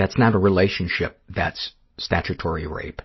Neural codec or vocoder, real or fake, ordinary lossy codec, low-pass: none; real; MP3, 24 kbps; 7.2 kHz